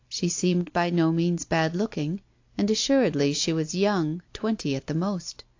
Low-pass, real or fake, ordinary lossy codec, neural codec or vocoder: 7.2 kHz; real; AAC, 48 kbps; none